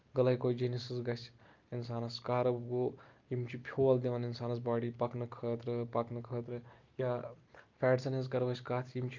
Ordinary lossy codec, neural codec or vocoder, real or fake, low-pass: Opus, 32 kbps; none; real; 7.2 kHz